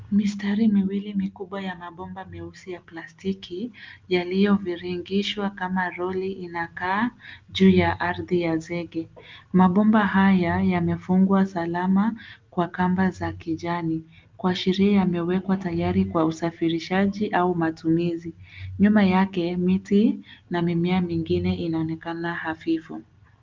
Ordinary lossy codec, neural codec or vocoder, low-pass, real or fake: Opus, 24 kbps; none; 7.2 kHz; real